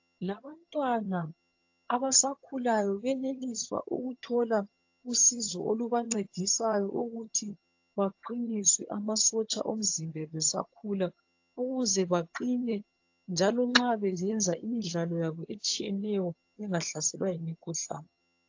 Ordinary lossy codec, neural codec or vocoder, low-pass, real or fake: AAC, 48 kbps; vocoder, 22.05 kHz, 80 mel bands, HiFi-GAN; 7.2 kHz; fake